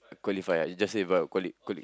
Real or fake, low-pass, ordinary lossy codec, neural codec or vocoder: real; none; none; none